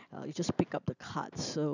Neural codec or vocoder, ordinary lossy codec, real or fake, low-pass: none; none; real; 7.2 kHz